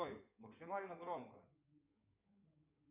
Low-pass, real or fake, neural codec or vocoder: 3.6 kHz; fake; codec, 16 kHz in and 24 kHz out, 2.2 kbps, FireRedTTS-2 codec